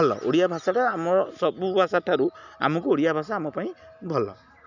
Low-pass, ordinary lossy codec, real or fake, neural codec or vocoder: 7.2 kHz; none; real; none